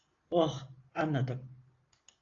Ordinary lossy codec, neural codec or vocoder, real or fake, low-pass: AAC, 32 kbps; none; real; 7.2 kHz